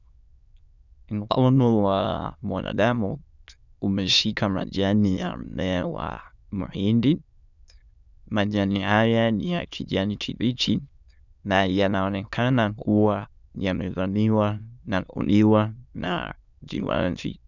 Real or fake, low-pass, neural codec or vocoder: fake; 7.2 kHz; autoencoder, 22.05 kHz, a latent of 192 numbers a frame, VITS, trained on many speakers